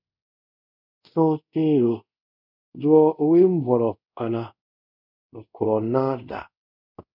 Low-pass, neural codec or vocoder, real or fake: 5.4 kHz; codec, 24 kHz, 0.5 kbps, DualCodec; fake